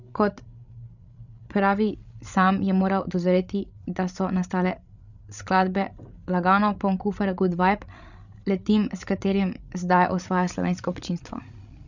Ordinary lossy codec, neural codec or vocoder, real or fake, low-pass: none; codec, 16 kHz, 16 kbps, FreqCodec, larger model; fake; 7.2 kHz